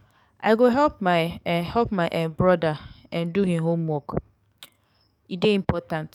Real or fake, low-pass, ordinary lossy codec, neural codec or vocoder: fake; 19.8 kHz; none; codec, 44.1 kHz, 7.8 kbps, DAC